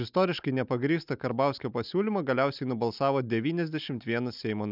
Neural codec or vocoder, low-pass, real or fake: none; 5.4 kHz; real